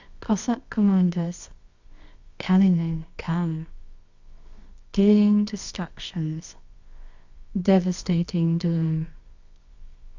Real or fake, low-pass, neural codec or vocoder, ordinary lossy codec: fake; 7.2 kHz; codec, 24 kHz, 0.9 kbps, WavTokenizer, medium music audio release; Opus, 64 kbps